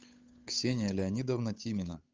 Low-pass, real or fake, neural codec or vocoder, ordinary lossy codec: 7.2 kHz; fake; codec, 16 kHz, 8 kbps, FunCodec, trained on Chinese and English, 25 frames a second; Opus, 24 kbps